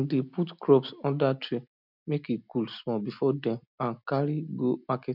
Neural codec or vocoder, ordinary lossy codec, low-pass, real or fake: none; none; 5.4 kHz; real